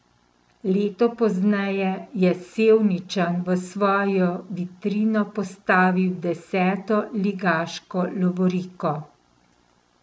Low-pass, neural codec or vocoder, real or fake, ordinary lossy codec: none; none; real; none